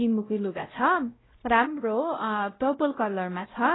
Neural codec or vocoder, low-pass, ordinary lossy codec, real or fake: codec, 16 kHz, 0.5 kbps, X-Codec, WavLM features, trained on Multilingual LibriSpeech; 7.2 kHz; AAC, 16 kbps; fake